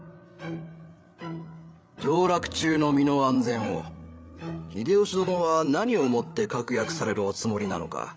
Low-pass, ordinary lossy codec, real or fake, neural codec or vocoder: none; none; fake; codec, 16 kHz, 8 kbps, FreqCodec, larger model